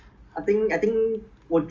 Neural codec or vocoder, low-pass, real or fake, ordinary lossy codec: none; 7.2 kHz; real; Opus, 32 kbps